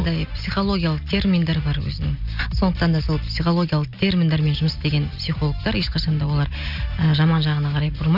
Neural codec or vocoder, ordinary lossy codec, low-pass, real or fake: none; none; 5.4 kHz; real